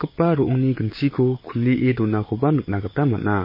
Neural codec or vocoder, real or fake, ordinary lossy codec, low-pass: codec, 16 kHz, 6 kbps, DAC; fake; MP3, 24 kbps; 5.4 kHz